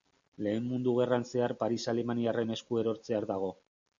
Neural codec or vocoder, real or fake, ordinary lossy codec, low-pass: none; real; MP3, 64 kbps; 7.2 kHz